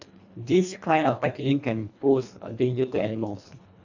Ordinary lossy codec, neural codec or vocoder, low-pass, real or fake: none; codec, 24 kHz, 1.5 kbps, HILCodec; 7.2 kHz; fake